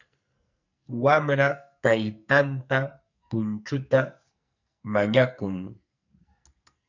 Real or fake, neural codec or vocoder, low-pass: fake; codec, 44.1 kHz, 2.6 kbps, SNAC; 7.2 kHz